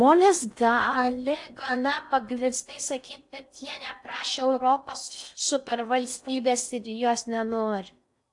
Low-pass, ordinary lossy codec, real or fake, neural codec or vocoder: 10.8 kHz; AAC, 64 kbps; fake; codec, 16 kHz in and 24 kHz out, 0.6 kbps, FocalCodec, streaming, 4096 codes